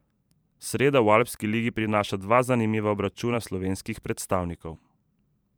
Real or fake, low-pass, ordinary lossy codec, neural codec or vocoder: fake; none; none; vocoder, 44.1 kHz, 128 mel bands every 512 samples, BigVGAN v2